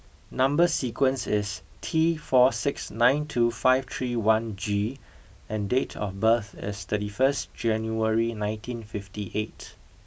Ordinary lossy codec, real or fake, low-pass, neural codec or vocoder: none; real; none; none